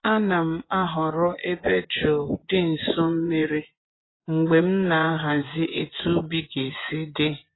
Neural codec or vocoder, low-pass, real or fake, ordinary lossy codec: vocoder, 24 kHz, 100 mel bands, Vocos; 7.2 kHz; fake; AAC, 16 kbps